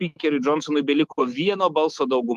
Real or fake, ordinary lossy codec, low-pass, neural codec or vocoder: fake; Opus, 24 kbps; 14.4 kHz; autoencoder, 48 kHz, 128 numbers a frame, DAC-VAE, trained on Japanese speech